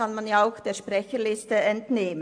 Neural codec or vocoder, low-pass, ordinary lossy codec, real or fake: none; 9.9 kHz; AAC, 64 kbps; real